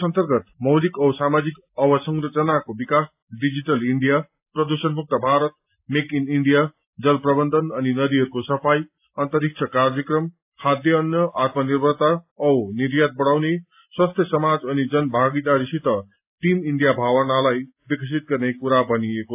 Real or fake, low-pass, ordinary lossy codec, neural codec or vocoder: real; 3.6 kHz; AAC, 32 kbps; none